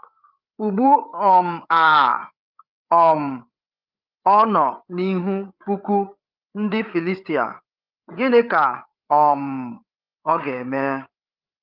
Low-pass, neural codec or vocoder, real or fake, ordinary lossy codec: 5.4 kHz; codec, 16 kHz, 8 kbps, FreqCodec, larger model; fake; Opus, 32 kbps